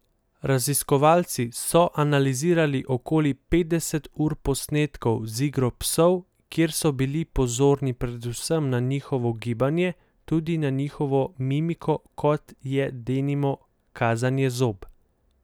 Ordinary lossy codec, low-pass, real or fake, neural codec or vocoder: none; none; real; none